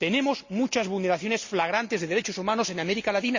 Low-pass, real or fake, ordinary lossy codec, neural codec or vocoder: 7.2 kHz; real; Opus, 64 kbps; none